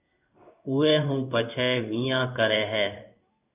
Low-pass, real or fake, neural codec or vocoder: 3.6 kHz; fake; codec, 16 kHz in and 24 kHz out, 1 kbps, XY-Tokenizer